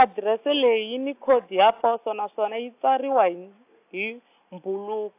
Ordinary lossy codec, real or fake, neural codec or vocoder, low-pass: AAC, 32 kbps; real; none; 3.6 kHz